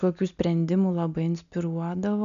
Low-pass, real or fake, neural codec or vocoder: 7.2 kHz; real; none